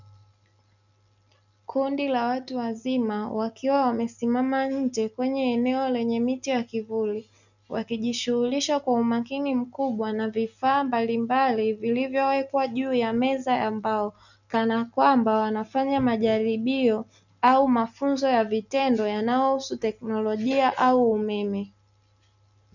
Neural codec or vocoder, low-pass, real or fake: none; 7.2 kHz; real